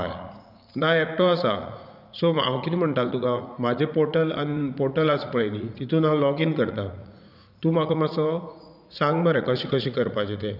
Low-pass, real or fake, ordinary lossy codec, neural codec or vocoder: 5.4 kHz; fake; none; vocoder, 44.1 kHz, 80 mel bands, Vocos